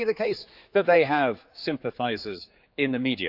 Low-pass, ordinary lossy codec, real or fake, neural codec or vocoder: 5.4 kHz; Opus, 64 kbps; fake; codec, 16 kHz, 4 kbps, X-Codec, HuBERT features, trained on general audio